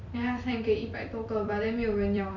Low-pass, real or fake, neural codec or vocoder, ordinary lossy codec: 7.2 kHz; fake; vocoder, 44.1 kHz, 128 mel bands every 256 samples, BigVGAN v2; none